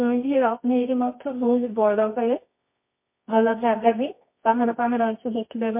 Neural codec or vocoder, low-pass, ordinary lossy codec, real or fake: codec, 24 kHz, 0.9 kbps, WavTokenizer, medium music audio release; 3.6 kHz; MP3, 24 kbps; fake